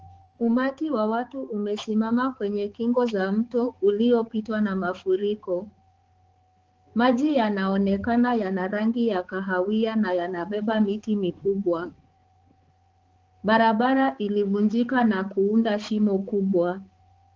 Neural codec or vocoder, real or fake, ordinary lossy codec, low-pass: codec, 16 kHz, 4 kbps, X-Codec, HuBERT features, trained on balanced general audio; fake; Opus, 16 kbps; 7.2 kHz